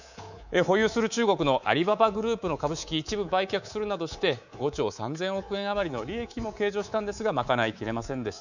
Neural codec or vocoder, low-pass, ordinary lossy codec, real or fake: codec, 24 kHz, 3.1 kbps, DualCodec; 7.2 kHz; none; fake